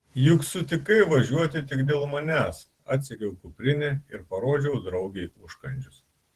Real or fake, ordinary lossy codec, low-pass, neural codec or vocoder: fake; Opus, 16 kbps; 14.4 kHz; vocoder, 48 kHz, 128 mel bands, Vocos